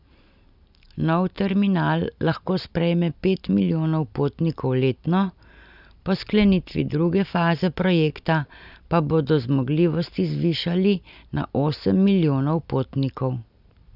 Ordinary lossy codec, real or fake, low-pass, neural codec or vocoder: none; real; 5.4 kHz; none